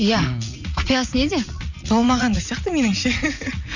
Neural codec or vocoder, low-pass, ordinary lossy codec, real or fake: vocoder, 44.1 kHz, 80 mel bands, Vocos; 7.2 kHz; none; fake